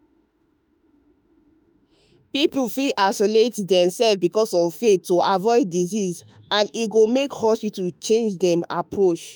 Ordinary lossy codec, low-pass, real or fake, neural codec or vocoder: none; none; fake; autoencoder, 48 kHz, 32 numbers a frame, DAC-VAE, trained on Japanese speech